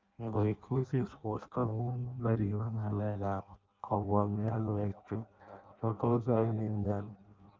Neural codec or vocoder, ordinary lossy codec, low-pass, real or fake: codec, 16 kHz in and 24 kHz out, 0.6 kbps, FireRedTTS-2 codec; Opus, 32 kbps; 7.2 kHz; fake